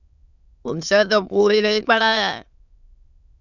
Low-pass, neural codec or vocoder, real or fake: 7.2 kHz; autoencoder, 22.05 kHz, a latent of 192 numbers a frame, VITS, trained on many speakers; fake